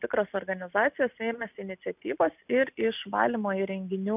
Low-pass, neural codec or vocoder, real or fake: 3.6 kHz; none; real